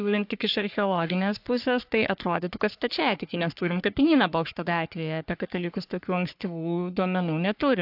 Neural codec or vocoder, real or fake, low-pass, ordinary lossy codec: codec, 44.1 kHz, 3.4 kbps, Pupu-Codec; fake; 5.4 kHz; AAC, 48 kbps